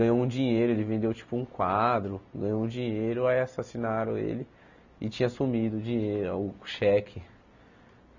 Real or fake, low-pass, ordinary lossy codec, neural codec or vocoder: real; 7.2 kHz; none; none